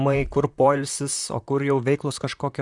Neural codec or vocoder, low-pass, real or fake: vocoder, 44.1 kHz, 128 mel bands, Pupu-Vocoder; 10.8 kHz; fake